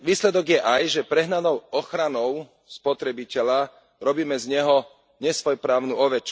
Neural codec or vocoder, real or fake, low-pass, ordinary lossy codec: none; real; none; none